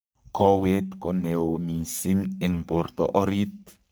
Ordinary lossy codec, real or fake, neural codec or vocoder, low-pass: none; fake; codec, 44.1 kHz, 3.4 kbps, Pupu-Codec; none